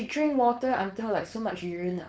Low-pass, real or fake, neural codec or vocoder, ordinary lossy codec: none; fake; codec, 16 kHz, 4.8 kbps, FACodec; none